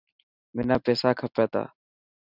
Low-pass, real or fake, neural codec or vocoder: 5.4 kHz; real; none